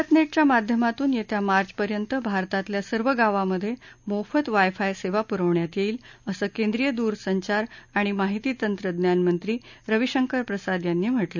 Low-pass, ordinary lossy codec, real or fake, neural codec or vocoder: 7.2 kHz; none; real; none